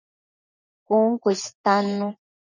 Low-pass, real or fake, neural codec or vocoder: 7.2 kHz; fake; vocoder, 24 kHz, 100 mel bands, Vocos